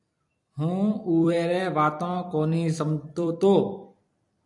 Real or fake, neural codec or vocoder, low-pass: fake; vocoder, 44.1 kHz, 128 mel bands every 256 samples, BigVGAN v2; 10.8 kHz